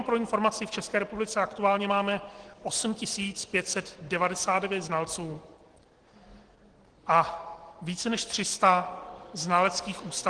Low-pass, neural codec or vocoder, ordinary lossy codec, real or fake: 9.9 kHz; none; Opus, 16 kbps; real